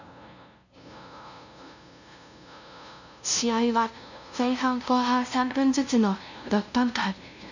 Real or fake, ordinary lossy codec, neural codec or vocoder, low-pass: fake; none; codec, 16 kHz, 0.5 kbps, FunCodec, trained on LibriTTS, 25 frames a second; 7.2 kHz